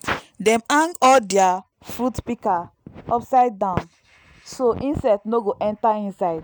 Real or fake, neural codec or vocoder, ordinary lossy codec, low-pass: real; none; none; none